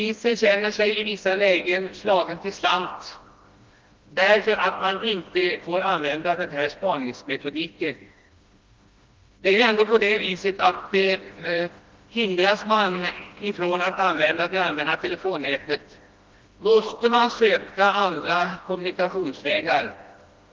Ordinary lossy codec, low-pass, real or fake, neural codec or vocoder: Opus, 32 kbps; 7.2 kHz; fake; codec, 16 kHz, 1 kbps, FreqCodec, smaller model